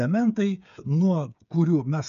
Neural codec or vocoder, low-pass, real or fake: codec, 16 kHz, 8 kbps, FreqCodec, smaller model; 7.2 kHz; fake